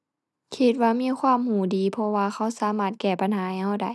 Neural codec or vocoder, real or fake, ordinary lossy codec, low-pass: none; real; none; none